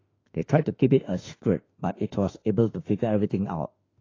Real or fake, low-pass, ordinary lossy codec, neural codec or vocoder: fake; 7.2 kHz; AAC, 32 kbps; autoencoder, 48 kHz, 32 numbers a frame, DAC-VAE, trained on Japanese speech